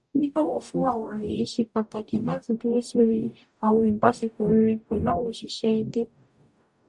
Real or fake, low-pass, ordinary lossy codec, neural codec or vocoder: fake; 10.8 kHz; none; codec, 44.1 kHz, 0.9 kbps, DAC